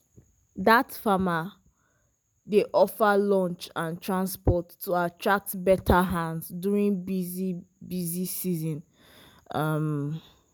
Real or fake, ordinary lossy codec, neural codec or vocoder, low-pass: real; none; none; none